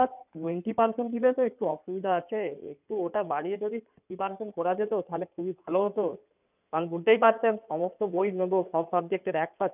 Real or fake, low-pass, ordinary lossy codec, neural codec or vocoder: fake; 3.6 kHz; none; codec, 16 kHz in and 24 kHz out, 2.2 kbps, FireRedTTS-2 codec